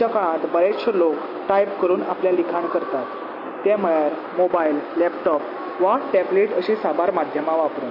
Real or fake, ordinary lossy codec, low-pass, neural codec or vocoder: real; MP3, 24 kbps; 5.4 kHz; none